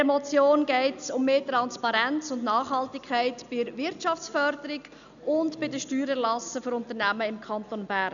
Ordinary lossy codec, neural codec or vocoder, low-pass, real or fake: none; none; 7.2 kHz; real